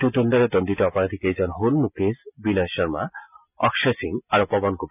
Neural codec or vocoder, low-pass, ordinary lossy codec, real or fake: none; 3.6 kHz; none; real